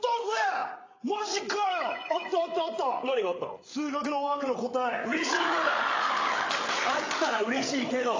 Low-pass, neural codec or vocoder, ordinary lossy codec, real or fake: 7.2 kHz; codec, 16 kHz, 8 kbps, FreqCodec, larger model; AAC, 32 kbps; fake